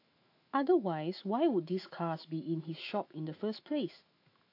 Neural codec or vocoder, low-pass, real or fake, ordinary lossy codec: autoencoder, 48 kHz, 128 numbers a frame, DAC-VAE, trained on Japanese speech; 5.4 kHz; fake; AAC, 32 kbps